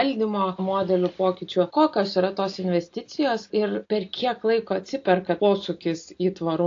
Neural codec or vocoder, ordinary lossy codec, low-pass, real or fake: none; AAC, 48 kbps; 7.2 kHz; real